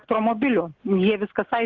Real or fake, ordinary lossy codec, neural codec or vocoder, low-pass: real; Opus, 16 kbps; none; 7.2 kHz